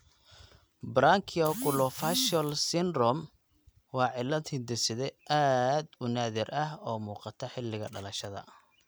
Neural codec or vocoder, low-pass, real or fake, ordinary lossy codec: none; none; real; none